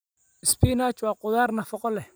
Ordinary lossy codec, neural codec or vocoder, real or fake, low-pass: none; none; real; none